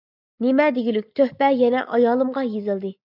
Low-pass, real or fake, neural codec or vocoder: 5.4 kHz; real; none